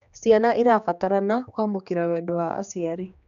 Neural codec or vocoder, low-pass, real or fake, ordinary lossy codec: codec, 16 kHz, 2 kbps, X-Codec, HuBERT features, trained on general audio; 7.2 kHz; fake; none